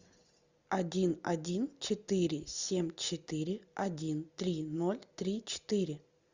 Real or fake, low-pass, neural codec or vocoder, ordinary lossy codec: real; 7.2 kHz; none; Opus, 64 kbps